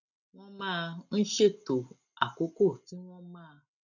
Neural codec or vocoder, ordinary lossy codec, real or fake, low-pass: none; none; real; 7.2 kHz